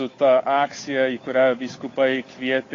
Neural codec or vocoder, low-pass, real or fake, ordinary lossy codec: codec, 16 kHz, 8 kbps, FunCodec, trained on Chinese and English, 25 frames a second; 7.2 kHz; fake; AAC, 32 kbps